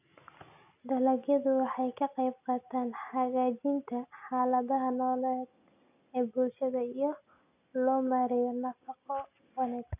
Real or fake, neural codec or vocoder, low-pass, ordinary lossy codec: real; none; 3.6 kHz; none